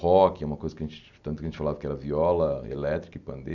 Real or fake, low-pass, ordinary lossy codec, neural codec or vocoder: real; 7.2 kHz; none; none